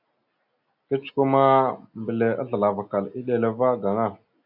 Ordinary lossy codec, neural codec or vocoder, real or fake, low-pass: AAC, 48 kbps; none; real; 5.4 kHz